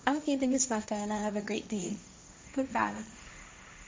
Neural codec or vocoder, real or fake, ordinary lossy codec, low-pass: codec, 16 kHz, 1.1 kbps, Voila-Tokenizer; fake; none; none